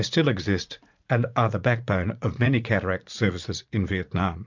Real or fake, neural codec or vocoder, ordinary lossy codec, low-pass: fake; vocoder, 44.1 kHz, 80 mel bands, Vocos; MP3, 64 kbps; 7.2 kHz